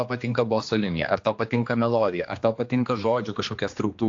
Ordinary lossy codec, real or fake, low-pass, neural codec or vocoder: AAC, 48 kbps; fake; 7.2 kHz; codec, 16 kHz, 2 kbps, X-Codec, HuBERT features, trained on general audio